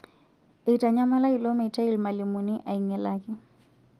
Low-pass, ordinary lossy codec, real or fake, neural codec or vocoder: 14.4 kHz; Opus, 24 kbps; real; none